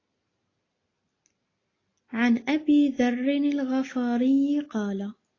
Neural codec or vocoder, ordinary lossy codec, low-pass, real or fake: none; AAC, 32 kbps; 7.2 kHz; real